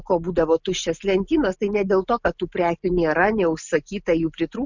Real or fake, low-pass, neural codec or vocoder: real; 7.2 kHz; none